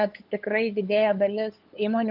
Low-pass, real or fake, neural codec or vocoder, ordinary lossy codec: 5.4 kHz; fake; codec, 16 kHz, 8 kbps, FunCodec, trained on LibriTTS, 25 frames a second; Opus, 32 kbps